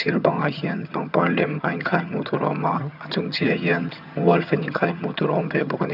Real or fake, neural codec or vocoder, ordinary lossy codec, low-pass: fake; vocoder, 22.05 kHz, 80 mel bands, HiFi-GAN; none; 5.4 kHz